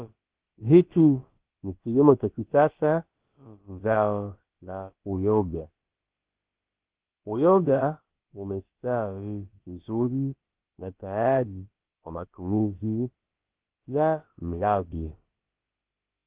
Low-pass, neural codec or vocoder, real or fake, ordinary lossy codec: 3.6 kHz; codec, 16 kHz, about 1 kbps, DyCAST, with the encoder's durations; fake; Opus, 16 kbps